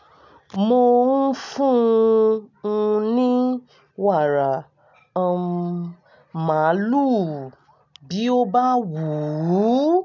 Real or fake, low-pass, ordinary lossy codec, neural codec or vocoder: real; 7.2 kHz; none; none